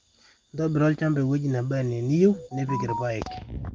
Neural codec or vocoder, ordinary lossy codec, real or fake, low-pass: none; Opus, 32 kbps; real; 7.2 kHz